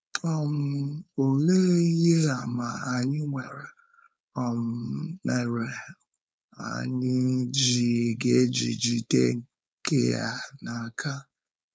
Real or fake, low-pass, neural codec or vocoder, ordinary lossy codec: fake; none; codec, 16 kHz, 4.8 kbps, FACodec; none